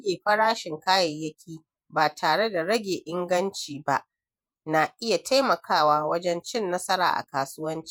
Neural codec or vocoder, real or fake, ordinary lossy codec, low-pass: vocoder, 48 kHz, 128 mel bands, Vocos; fake; none; none